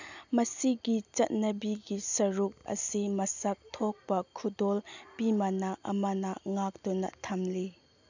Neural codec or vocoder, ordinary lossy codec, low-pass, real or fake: none; none; 7.2 kHz; real